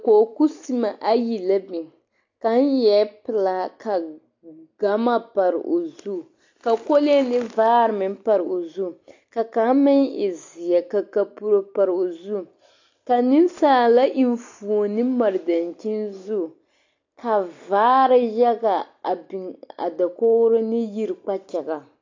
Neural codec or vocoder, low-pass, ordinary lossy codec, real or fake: none; 7.2 kHz; AAC, 48 kbps; real